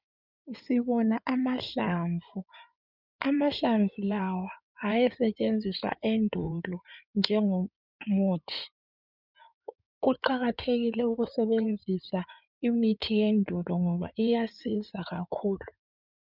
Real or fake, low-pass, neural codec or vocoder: fake; 5.4 kHz; codec, 16 kHz in and 24 kHz out, 2.2 kbps, FireRedTTS-2 codec